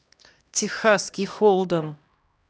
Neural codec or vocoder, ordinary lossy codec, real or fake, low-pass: codec, 16 kHz, 1 kbps, X-Codec, HuBERT features, trained on LibriSpeech; none; fake; none